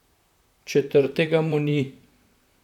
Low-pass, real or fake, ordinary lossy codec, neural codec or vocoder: 19.8 kHz; fake; none; vocoder, 44.1 kHz, 128 mel bands, Pupu-Vocoder